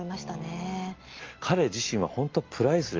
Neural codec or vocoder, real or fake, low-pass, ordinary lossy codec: none; real; 7.2 kHz; Opus, 24 kbps